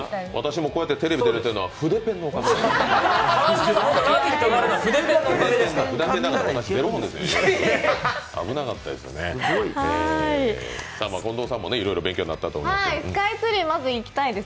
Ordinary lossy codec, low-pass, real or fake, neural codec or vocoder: none; none; real; none